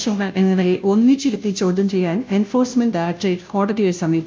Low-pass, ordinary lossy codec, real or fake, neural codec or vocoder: none; none; fake; codec, 16 kHz, 0.5 kbps, FunCodec, trained on Chinese and English, 25 frames a second